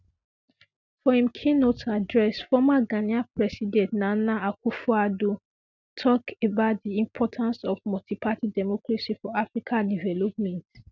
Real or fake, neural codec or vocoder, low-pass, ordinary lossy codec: real; none; 7.2 kHz; none